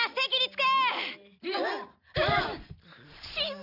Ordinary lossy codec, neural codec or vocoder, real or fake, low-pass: none; none; real; 5.4 kHz